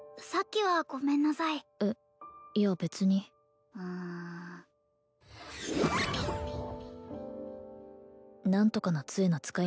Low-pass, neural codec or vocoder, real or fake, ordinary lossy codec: none; none; real; none